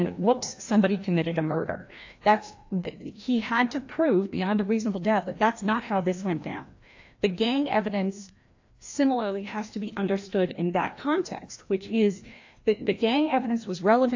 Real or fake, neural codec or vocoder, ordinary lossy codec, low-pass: fake; codec, 16 kHz, 1 kbps, FreqCodec, larger model; AAC, 48 kbps; 7.2 kHz